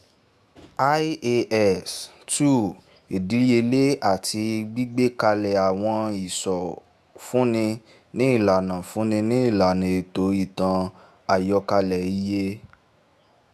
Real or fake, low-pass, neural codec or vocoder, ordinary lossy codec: fake; 14.4 kHz; autoencoder, 48 kHz, 128 numbers a frame, DAC-VAE, trained on Japanese speech; Opus, 64 kbps